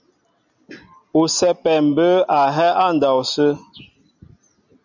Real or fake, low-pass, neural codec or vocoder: real; 7.2 kHz; none